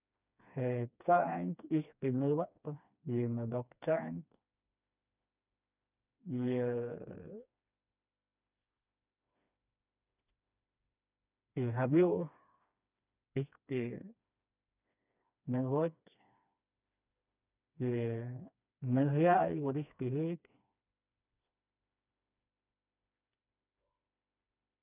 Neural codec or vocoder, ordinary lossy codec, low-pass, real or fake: codec, 16 kHz, 2 kbps, FreqCodec, smaller model; none; 3.6 kHz; fake